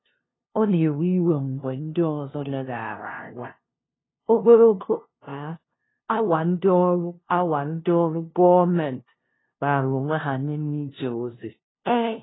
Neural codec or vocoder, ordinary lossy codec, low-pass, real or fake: codec, 16 kHz, 0.5 kbps, FunCodec, trained on LibriTTS, 25 frames a second; AAC, 16 kbps; 7.2 kHz; fake